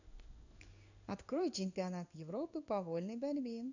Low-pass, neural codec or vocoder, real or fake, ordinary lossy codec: 7.2 kHz; codec, 16 kHz in and 24 kHz out, 1 kbps, XY-Tokenizer; fake; none